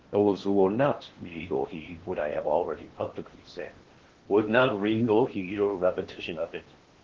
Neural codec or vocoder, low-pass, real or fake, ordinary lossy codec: codec, 16 kHz in and 24 kHz out, 0.8 kbps, FocalCodec, streaming, 65536 codes; 7.2 kHz; fake; Opus, 16 kbps